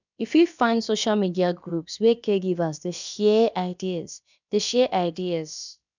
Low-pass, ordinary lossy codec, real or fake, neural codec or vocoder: 7.2 kHz; none; fake; codec, 16 kHz, about 1 kbps, DyCAST, with the encoder's durations